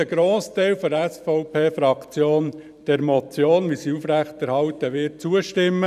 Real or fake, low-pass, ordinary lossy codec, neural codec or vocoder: fake; 14.4 kHz; none; vocoder, 44.1 kHz, 128 mel bands every 512 samples, BigVGAN v2